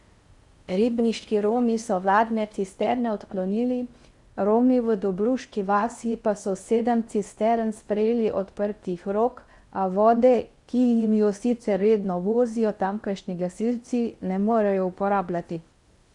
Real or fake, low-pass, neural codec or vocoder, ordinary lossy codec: fake; 10.8 kHz; codec, 16 kHz in and 24 kHz out, 0.6 kbps, FocalCodec, streaming, 4096 codes; Opus, 64 kbps